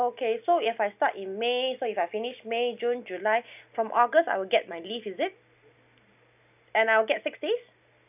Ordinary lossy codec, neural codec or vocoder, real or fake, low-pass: none; none; real; 3.6 kHz